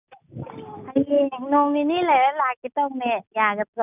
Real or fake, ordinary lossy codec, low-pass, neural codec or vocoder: real; none; 3.6 kHz; none